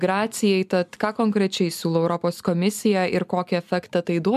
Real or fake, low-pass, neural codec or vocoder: real; 14.4 kHz; none